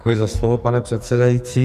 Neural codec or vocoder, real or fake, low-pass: codec, 44.1 kHz, 2.6 kbps, SNAC; fake; 14.4 kHz